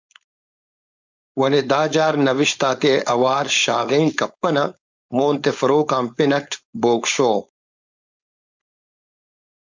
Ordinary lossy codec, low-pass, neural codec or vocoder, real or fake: MP3, 64 kbps; 7.2 kHz; codec, 16 kHz, 4.8 kbps, FACodec; fake